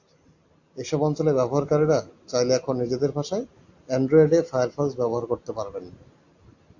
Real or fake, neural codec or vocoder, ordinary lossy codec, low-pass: real; none; AAC, 48 kbps; 7.2 kHz